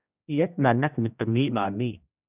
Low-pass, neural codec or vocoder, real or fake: 3.6 kHz; codec, 16 kHz, 1 kbps, X-Codec, HuBERT features, trained on general audio; fake